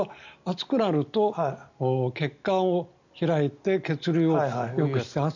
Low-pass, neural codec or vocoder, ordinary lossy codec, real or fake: 7.2 kHz; none; none; real